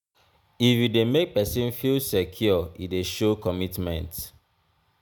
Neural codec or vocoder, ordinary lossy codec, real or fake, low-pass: none; none; real; none